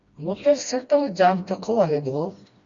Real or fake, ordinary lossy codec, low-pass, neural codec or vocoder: fake; Opus, 64 kbps; 7.2 kHz; codec, 16 kHz, 1 kbps, FreqCodec, smaller model